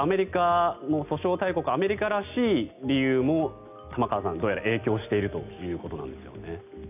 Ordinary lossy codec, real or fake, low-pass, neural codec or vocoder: none; real; 3.6 kHz; none